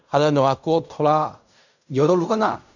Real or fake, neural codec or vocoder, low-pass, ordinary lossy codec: fake; codec, 16 kHz in and 24 kHz out, 0.4 kbps, LongCat-Audio-Codec, fine tuned four codebook decoder; 7.2 kHz; none